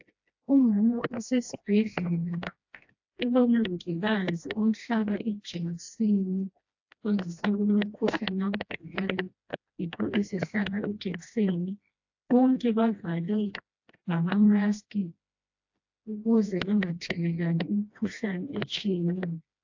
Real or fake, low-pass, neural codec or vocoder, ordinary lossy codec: fake; 7.2 kHz; codec, 16 kHz, 1 kbps, FreqCodec, smaller model; AAC, 48 kbps